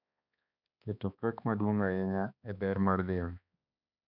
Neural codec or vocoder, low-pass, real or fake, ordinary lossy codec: codec, 16 kHz, 2 kbps, X-Codec, HuBERT features, trained on balanced general audio; 5.4 kHz; fake; none